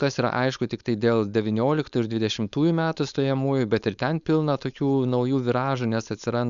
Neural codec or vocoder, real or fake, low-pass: codec, 16 kHz, 4.8 kbps, FACodec; fake; 7.2 kHz